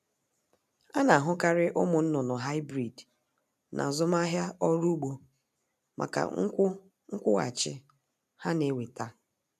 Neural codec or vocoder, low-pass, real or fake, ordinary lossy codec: none; 14.4 kHz; real; none